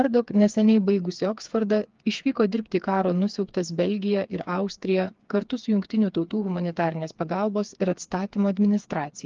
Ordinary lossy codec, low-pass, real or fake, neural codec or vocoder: Opus, 32 kbps; 7.2 kHz; fake; codec, 16 kHz, 4 kbps, FreqCodec, smaller model